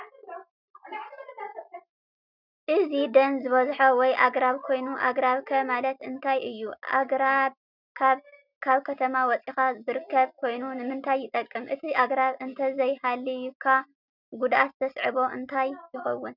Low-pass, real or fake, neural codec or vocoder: 5.4 kHz; real; none